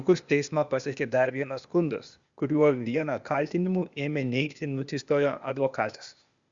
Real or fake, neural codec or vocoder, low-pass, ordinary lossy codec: fake; codec, 16 kHz, 0.8 kbps, ZipCodec; 7.2 kHz; Opus, 64 kbps